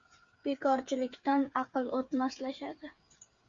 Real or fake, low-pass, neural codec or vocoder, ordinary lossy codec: fake; 7.2 kHz; codec, 16 kHz, 8 kbps, FreqCodec, smaller model; Opus, 64 kbps